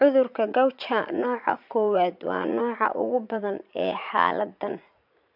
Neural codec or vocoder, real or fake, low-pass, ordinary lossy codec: vocoder, 44.1 kHz, 80 mel bands, Vocos; fake; 5.4 kHz; MP3, 48 kbps